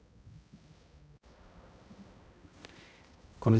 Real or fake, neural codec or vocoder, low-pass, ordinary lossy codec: fake; codec, 16 kHz, 0.5 kbps, X-Codec, HuBERT features, trained on balanced general audio; none; none